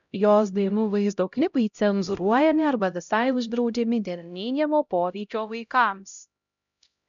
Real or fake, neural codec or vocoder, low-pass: fake; codec, 16 kHz, 0.5 kbps, X-Codec, HuBERT features, trained on LibriSpeech; 7.2 kHz